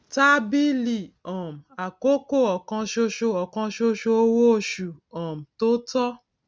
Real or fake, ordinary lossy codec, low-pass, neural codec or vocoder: real; none; none; none